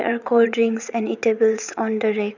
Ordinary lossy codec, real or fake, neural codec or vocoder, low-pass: none; fake; vocoder, 22.05 kHz, 80 mel bands, WaveNeXt; 7.2 kHz